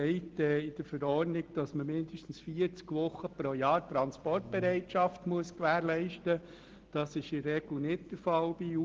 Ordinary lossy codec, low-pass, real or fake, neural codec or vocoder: Opus, 16 kbps; 7.2 kHz; real; none